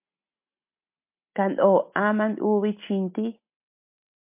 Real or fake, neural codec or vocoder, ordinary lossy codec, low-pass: real; none; MP3, 32 kbps; 3.6 kHz